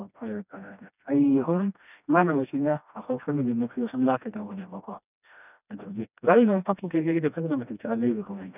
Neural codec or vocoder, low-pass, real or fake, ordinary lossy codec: codec, 16 kHz, 1 kbps, FreqCodec, smaller model; 3.6 kHz; fake; none